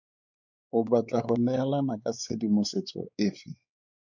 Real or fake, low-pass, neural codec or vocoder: fake; 7.2 kHz; codec, 16 kHz, 4 kbps, X-Codec, WavLM features, trained on Multilingual LibriSpeech